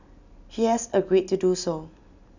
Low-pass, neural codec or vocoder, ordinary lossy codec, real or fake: 7.2 kHz; none; none; real